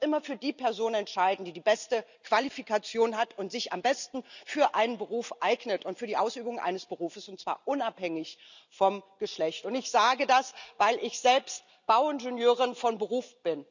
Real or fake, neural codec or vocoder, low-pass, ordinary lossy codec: real; none; 7.2 kHz; none